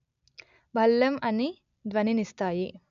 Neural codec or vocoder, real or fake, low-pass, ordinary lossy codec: none; real; 7.2 kHz; none